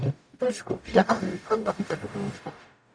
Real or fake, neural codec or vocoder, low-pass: fake; codec, 44.1 kHz, 0.9 kbps, DAC; 9.9 kHz